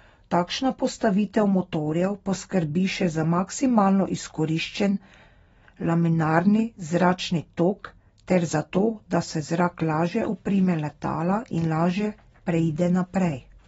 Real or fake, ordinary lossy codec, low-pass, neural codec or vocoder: real; AAC, 24 kbps; 19.8 kHz; none